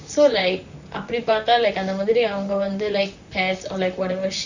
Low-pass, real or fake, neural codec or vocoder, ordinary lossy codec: 7.2 kHz; fake; vocoder, 44.1 kHz, 128 mel bands, Pupu-Vocoder; Opus, 64 kbps